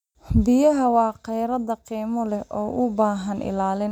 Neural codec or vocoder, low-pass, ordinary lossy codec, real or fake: none; 19.8 kHz; none; real